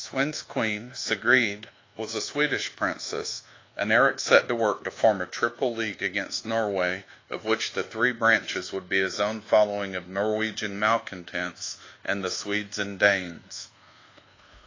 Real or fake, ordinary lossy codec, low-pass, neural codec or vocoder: fake; AAC, 32 kbps; 7.2 kHz; codec, 24 kHz, 1.2 kbps, DualCodec